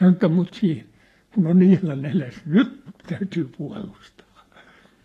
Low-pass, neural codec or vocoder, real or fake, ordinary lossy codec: 14.4 kHz; codec, 44.1 kHz, 7.8 kbps, Pupu-Codec; fake; AAC, 48 kbps